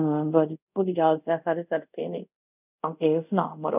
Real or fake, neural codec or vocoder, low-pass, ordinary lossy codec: fake; codec, 24 kHz, 0.5 kbps, DualCodec; 3.6 kHz; none